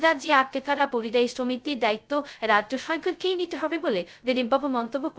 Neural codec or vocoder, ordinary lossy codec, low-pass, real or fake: codec, 16 kHz, 0.2 kbps, FocalCodec; none; none; fake